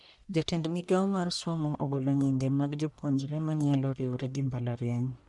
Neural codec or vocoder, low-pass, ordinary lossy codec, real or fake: codec, 44.1 kHz, 1.7 kbps, Pupu-Codec; 10.8 kHz; none; fake